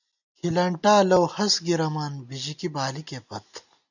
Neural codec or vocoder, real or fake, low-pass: none; real; 7.2 kHz